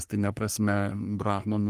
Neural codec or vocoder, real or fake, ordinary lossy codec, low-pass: codec, 44.1 kHz, 3.4 kbps, Pupu-Codec; fake; Opus, 24 kbps; 14.4 kHz